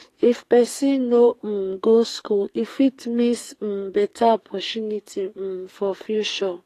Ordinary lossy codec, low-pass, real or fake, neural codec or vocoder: AAC, 48 kbps; 14.4 kHz; fake; codec, 44.1 kHz, 2.6 kbps, SNAC